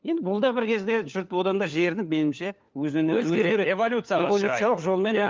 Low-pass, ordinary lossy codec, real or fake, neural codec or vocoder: 7.2 kHz; Opus, 32 kbps; fake; codec, 16 kHz, 4 kbps, FunCodec, trained on LibriTTS, 50 frames a second